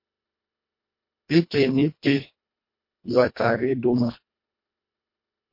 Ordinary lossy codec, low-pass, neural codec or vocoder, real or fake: MP3, 32 kbps; 5.4 kHz; codec, 24 kHz, 1.5 kbps, HILCodec; fake